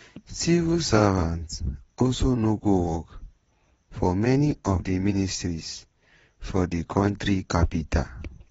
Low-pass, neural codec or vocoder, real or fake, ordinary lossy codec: 9.9 kHz; vocoder, 22.05 kHz, 80 mel bands, WaveNeXt; fake; AAC, 24 kbps